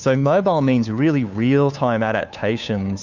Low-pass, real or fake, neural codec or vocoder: 7.2 kHz; fake; codec, 16 kHz, 2 kbps, FunCodec, trained on Chinese and English, 25 frames a second